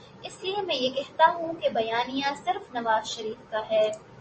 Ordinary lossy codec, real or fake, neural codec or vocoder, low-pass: MP3, 32 kbps; real; none; 10.8 kHz